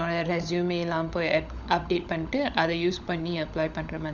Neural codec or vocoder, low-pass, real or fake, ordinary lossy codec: codec, 16 kHz, 16 kbps, FunCodec, trained on LibriTTS, 50 frames a second; 7.2 kHz; fake; none